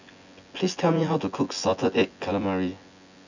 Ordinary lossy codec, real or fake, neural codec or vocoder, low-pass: none; fake; vocoder, 24 kHz, 100 mel bands, Vocos; 7.2 kHz